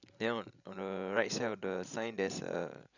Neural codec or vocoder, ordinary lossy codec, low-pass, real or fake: codec, 16 kHz, 16 kbps, FreqCodec, larger model; none; 7.2 kHz; fake